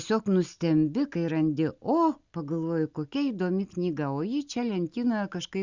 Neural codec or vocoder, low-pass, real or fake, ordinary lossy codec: none; 7.2 kHz; real; Opus, 64 kbps